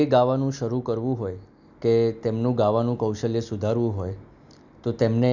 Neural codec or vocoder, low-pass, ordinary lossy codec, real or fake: none; 7.2 kHz; none; real